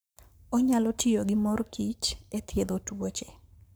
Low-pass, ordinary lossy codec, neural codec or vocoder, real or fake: none; none; codec, 44.1 kHz, 7.8 kbps, Pupu-Codec; fake